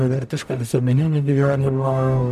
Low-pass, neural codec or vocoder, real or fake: 14.4 kHz; codec, 44.1 kHz, 0.9 kbps, DAC; fake